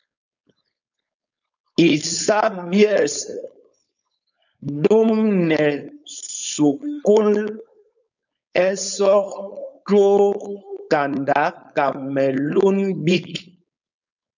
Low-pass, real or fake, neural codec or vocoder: 7.2 kHz; fake; codec, 16 kHz, 4.8 kbps, FACodec